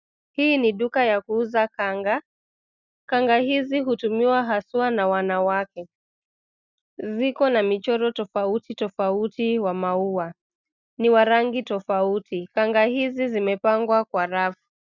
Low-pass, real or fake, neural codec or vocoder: 7.2 kHz; real; none